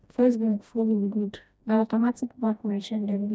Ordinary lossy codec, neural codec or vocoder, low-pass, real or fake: none; codec, 16 kHz, 1 kbps, FreqCodec, smaller model; none; fake